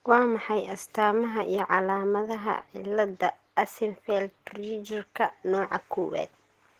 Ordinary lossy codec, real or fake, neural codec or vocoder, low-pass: Opus, 16 kbps; real; none; 14.4 kHz